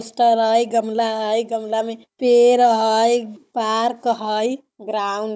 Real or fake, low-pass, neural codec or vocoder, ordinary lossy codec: fake; none; codec, 16 kHz, 16 kbps, FunCodec, trained on Chinese and English, 50 frames a second; none